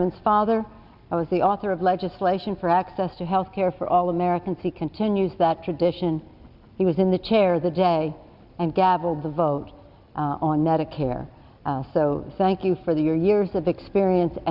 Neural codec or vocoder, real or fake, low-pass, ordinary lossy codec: none; real; 5.4 kHz; Opus, 64 kbps